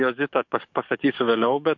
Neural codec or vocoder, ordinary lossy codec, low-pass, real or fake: codec, 44.1 kHz, 7.8 kbps, DAC; MP3, 48 kbps; 7.2 kHz; fake